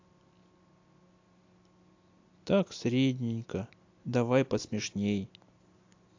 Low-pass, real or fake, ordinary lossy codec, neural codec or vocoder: 7.2 kHz; real; AAC, 48 kbps; none